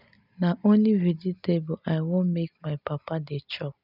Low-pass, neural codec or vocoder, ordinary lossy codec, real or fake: 5.4 kHz; none; none; real